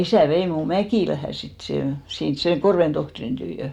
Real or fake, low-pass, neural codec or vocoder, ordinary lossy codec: real; 19.8 kHz; none; none